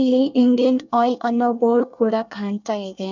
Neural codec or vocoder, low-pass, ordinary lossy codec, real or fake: codec, 24 kHz, 1 kbps, SNAC; 7.2 kHz; none; fake